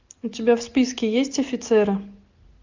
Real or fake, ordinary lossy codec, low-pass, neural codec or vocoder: real; MP3, 64 kbps; 7.2 kHz; none